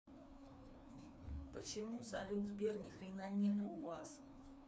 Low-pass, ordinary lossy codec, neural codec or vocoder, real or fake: none; none; codec, 16 kHz, 2 kbps, FreqCodec, larger model; fake